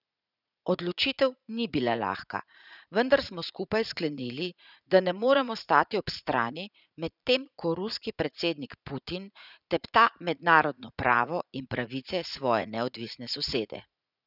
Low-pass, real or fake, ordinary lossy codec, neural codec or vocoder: 5.4 kHz; fake; none; vocoder, 44.1 kHz, 80 mel bands, Vocos